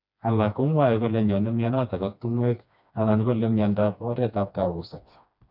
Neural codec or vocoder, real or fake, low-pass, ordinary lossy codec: codec, 16 kHz, 2 kbps, FreqCodec, smaller model; fake; 5.4 kHz; none